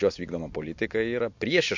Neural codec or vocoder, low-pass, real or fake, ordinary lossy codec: none; 7.2 kHz; real; MP3, 48 kbps